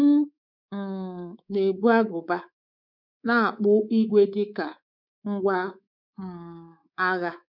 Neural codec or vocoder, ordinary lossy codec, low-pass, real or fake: codec, 24 kHz, 3.1 kbps, DualCodec; none; 5.4 kHz; fake